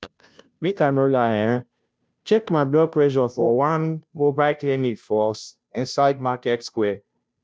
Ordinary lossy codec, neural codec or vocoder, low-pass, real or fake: none; codec, 16 kHz, 0.5 kbps, FunCodec, trained on Chinese and English, 25 frames a second; none; fake